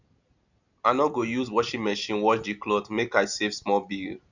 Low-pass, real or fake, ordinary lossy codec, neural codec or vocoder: 7.2 kHz; real; none; none